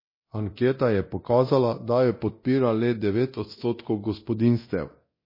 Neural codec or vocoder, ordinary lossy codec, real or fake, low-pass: codec, 24 kHz, 0.9 kbps, DualCodec; MP3, 24 kbps; fake; 5.4 kHz